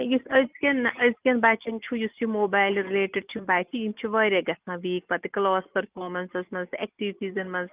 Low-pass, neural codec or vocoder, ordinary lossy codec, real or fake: 3.6 kHz; none; Opus, 24 kbps; real